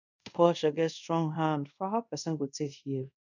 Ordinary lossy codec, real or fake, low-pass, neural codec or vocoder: none; fake; 7.2 kHz; codec, 24 kHz, 0.5 kbps, DualCodec